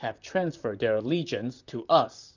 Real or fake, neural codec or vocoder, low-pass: fake; vocoder, 44.1 kHz, 128 mel bands, Pupu-Vocoder; 7.2 kHz